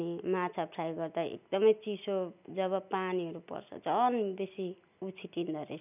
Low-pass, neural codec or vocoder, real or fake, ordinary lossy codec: 3.6 kHz; none; real; none